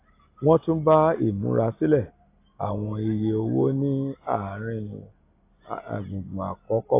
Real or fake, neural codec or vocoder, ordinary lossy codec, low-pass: real; none; AAC, 24 kbps; 3.6 kHz